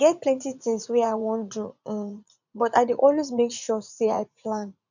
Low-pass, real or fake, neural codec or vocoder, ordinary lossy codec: 7.2 kHz; fake; vocoder, 44.1 kHz, 80 mel bands, Vocos; none